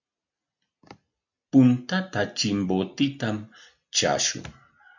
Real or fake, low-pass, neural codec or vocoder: real; 7.2 kHz; none